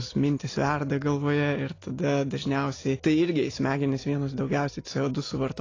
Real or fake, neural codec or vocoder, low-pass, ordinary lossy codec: real; none; 7.2 kHz; AAC, 32 kbps